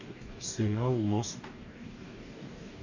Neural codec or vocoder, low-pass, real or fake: codec, 44.1 kHz, 2.6 kbps, DAC; 7.2 kHz; fake